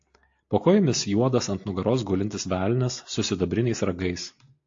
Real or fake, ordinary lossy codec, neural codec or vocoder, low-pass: real; AAC, 48 kbps; none; 7.2 kHz